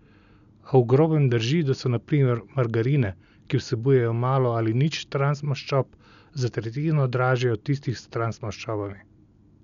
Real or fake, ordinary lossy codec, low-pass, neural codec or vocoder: real; none; 7.2 kHz; none